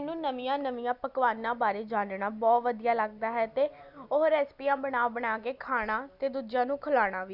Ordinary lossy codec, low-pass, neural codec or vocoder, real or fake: none; 5.4 kHz; none; real